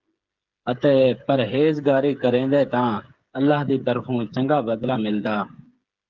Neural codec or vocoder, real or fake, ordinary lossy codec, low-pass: codec, 16 kHz, 8 kbps, FreqCodec, smaller model; fake; Opus, 24 kbps; 7.2 kHz